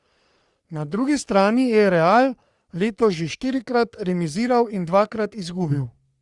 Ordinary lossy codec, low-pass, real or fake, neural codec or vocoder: Opus, 64 kbps; 10.8 kHz; fake; codec, 44.1 kHz, 3.4 kbps, Pupu-Codec